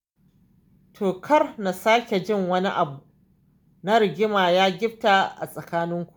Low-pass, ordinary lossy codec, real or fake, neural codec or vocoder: none; none; real; none